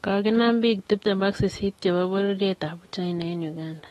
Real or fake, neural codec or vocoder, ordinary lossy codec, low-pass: fake; autoencoder, 48 kHz, 128 numbers a frame, DAC-VAE, trained on Japanese speech; AAC, 32 kbps; 19.8 kHz